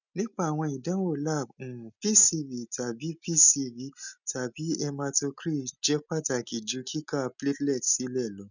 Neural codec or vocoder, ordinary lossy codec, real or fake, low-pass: none; none; real; 7.2 kHz